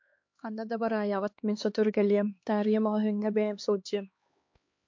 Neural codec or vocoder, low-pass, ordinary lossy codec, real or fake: codec, 16 kHz, 2 kbps, X-Codec, HuBERT features, trained on LibriSpeech; 7.2 kHz; MP3, 48 kbps; fake